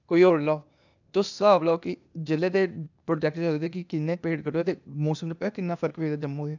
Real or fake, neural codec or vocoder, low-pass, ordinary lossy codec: fake; codec, 16 kHz, 0.8 kbps, ZipCodec; 7.2 kHz; none